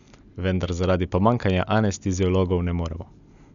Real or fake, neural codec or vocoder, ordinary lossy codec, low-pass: real; none; MP3, 96 kbps; 7.2 kHz